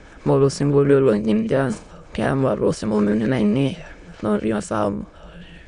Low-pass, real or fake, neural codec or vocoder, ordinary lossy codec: 9.9 kHz; fake; autoencoder, 22.05 kHz, a latent of 192 numbers a frame, VITS, trained on many speakers; none